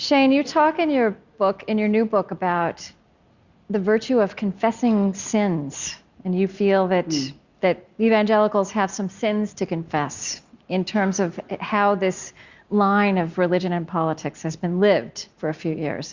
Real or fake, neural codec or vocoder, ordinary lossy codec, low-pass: real; none; Opus, 64 kbps; 7.2 kHz